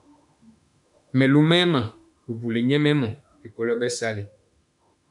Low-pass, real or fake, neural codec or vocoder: 10.8 kHz; fake; autoencoder, 48 kHz, 32 numbers a frame, DAC-VAE, trained on Japanese speech